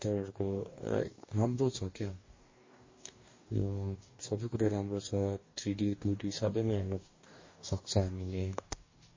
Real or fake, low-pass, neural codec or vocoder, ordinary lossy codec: fake; 7.2 kHz; codec, 44.1 kHz, 2.6 kbps, DAC; MP3, 32 kbps